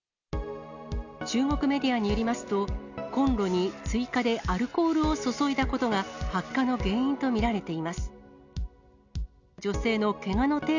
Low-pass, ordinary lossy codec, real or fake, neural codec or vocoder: 7.2 kHz; none; real; none